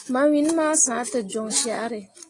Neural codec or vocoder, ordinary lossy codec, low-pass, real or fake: none; AAC, 32 kbps; 10.8 kHz; real